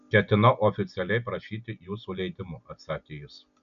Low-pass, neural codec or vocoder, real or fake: 7.2 kHz; none; real